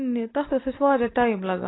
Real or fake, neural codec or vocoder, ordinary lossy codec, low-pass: real; none; AAC, 16 kbps; 7.2 kHz